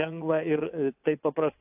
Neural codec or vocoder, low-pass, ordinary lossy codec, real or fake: vocoder, 22.05 kHz, 80 mel bands, WaveNeXt; 3.6 kHz; AAC, 32 kbps; fake